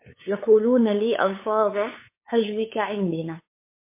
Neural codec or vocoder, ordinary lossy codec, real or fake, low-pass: codec, 16 kHz, 8 kbps, FunCodec, trained on LibriTTS, 25 frames a second; MP3, 24 kbps; fake; 3.6 kHz